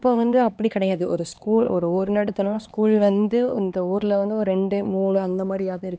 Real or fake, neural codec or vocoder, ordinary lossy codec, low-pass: fake; codec, 16 kHz, 2 kbps, X-Codec, HuBERT features, trained on LibriSpeech; none; none